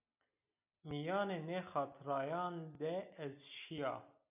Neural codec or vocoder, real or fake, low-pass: none; real; 3.6 kHz